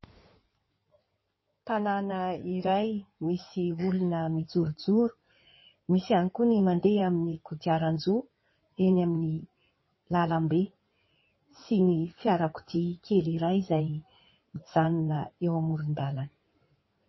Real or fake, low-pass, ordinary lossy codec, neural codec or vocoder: fake; 7.2 kHz; MP3, 24 kbps; codec, 16 kHz in and 24 kHz out, 2.2 kbps, FireRedTTS-2 codec